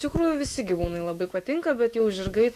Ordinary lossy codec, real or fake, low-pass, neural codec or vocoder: Opus, 64 kbps; fake; 14.4 kHz; autoencoder, 48 kHz, 128 numbers a frame, DAC-VAE, trained on Japanese speech